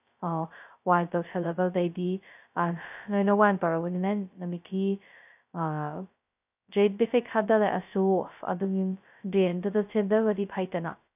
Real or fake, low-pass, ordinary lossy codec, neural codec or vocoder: fake; 3.6 kHz; none; codec, 16 kHz, 0.2 kbps, FocalCodec